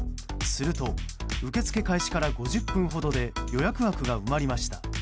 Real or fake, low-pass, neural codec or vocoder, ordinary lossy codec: real; none; none; none